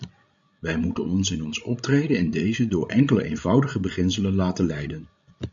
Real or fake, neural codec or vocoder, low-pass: fake; codec, 16 kHz, 16 kbps, FreqCodec, larger model; 7.2 kHz